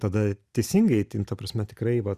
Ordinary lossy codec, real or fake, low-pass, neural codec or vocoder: Opus, 64 kbps; real; 14.4 kHz; none